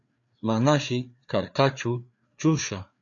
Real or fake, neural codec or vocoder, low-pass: fake; codec, 16 kHz, 4 kbps, FreqCodec, larger model; 7.2 kHz